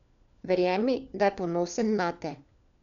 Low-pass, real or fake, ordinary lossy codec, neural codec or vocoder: 7.2 kHz; fake; none; codec, 16 kHz, 4 kbps, FunCodec, trained on LibriTTS, 50 frames a second